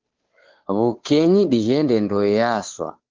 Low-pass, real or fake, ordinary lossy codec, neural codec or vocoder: 7.2 kHz; fake; Opus, 16 kbps; codec, 16 kHz, 2 kbps, FunCodec, trained on Chinese and English, 25 frames a second